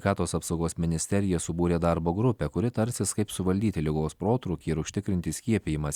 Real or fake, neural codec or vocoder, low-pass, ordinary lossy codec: real; none; 19.8 kHz; Opus, 64 kbps